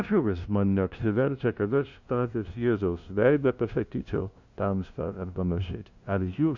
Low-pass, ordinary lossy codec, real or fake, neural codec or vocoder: 7.2 kHz; Opus, 64 kbps; fake; codec, 16 kHz, 0.5 kbps, FunCodec, trained on LibriTTS, 25 frames a second